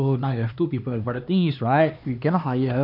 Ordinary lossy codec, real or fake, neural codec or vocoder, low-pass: none; fake; codec, 16 kHz, 2 kbps, X-Codec, HuBERT features, trained on LibriSpeech; 5.4 kHz